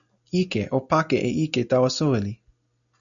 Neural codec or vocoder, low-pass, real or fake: none; 7.2 kHz; real